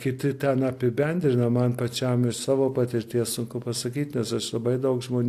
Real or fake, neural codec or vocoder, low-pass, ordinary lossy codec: real; none; 14.4 kHz; AAC, 64 kbps